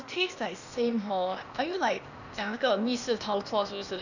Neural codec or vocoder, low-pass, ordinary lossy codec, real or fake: codec, 16 kHz, 0.8 kbps, ZipCodec; 7.2 kHz; none; fake